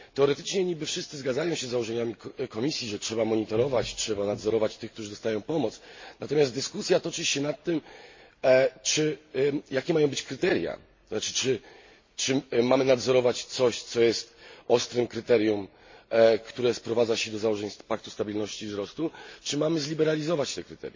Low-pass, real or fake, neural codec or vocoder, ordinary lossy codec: 7.2 kHz; real; none; MP3, 32 kbps